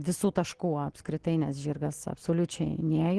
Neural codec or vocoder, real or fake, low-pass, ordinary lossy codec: none; real; 10.8 kHz; Opus, 16 kbps